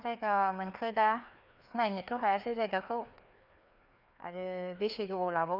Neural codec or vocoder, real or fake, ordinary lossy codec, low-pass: codec, 16 kHz, 2 kbps, FreqCodec, larger model; fake; none; 5.4 kHz